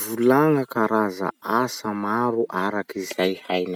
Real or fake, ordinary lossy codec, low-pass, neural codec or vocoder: real; none; 19.8 kHz; none